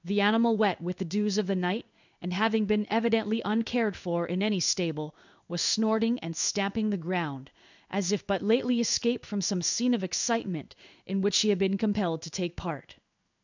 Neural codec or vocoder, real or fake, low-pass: codec, 16 kHz in and 24 kHz out, 1 kbps, XY-Tokenizer; fake; 7.2 kHz